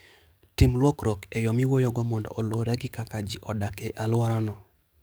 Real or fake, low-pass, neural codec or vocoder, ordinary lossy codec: fake; none; codec, 44.1 kHz, 7.8 kbps, DAC; none